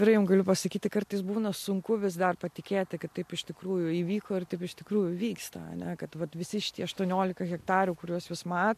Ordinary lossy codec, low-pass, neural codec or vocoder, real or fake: MP3, 64 kbps; 14.4 kHz; none; real